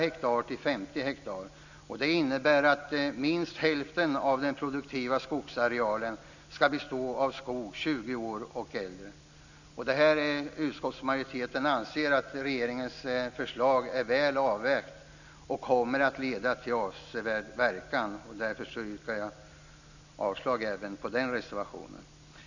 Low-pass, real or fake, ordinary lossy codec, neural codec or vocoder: 7.2 kHz; real; none; none